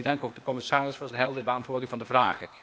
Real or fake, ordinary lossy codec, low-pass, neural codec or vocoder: fake; none; none; codec, 16 kHz, 0.8 kbps, ZipCodec